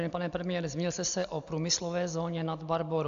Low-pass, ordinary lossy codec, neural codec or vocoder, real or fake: 7.2 kHz; AAC, 64 kbps; none; real